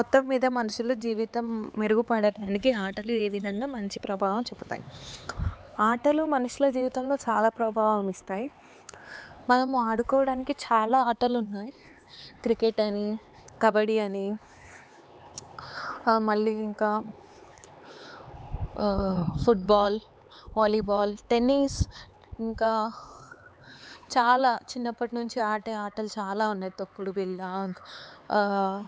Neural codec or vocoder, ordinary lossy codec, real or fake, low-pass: codec, 16 kHz, 4 kbps, X-Codec, HuBERT features, trained on LibriSpeech; none; fake; none